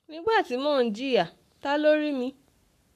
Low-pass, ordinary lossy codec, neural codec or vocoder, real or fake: 14.4 kHz; none; codec, 44.1 kHz, 7.8 kbps, Pupu-Codec; fake